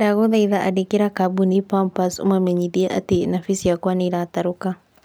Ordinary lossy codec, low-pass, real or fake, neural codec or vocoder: none; none; real; none